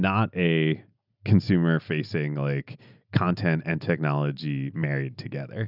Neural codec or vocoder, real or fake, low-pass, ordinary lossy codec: none; real; 5.4 kHz; Opus, 64 kbps